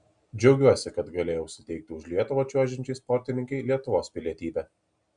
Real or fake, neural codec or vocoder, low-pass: real; none; 9.9 kHz